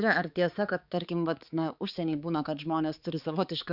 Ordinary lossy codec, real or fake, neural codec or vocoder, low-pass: Opus, 64 kbps; fake; codec, 16 kHz, 4 kbps, X-Codec, HuBERT features, trained on balanced general audio; 5.4 kHz